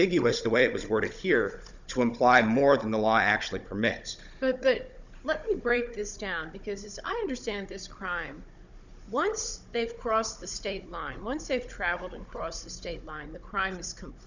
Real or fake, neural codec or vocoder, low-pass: fake; codec, 16 kHz, 16 kbps, FunCodec, trained on Chinese and English, 50 frames a second; 7.2 kHz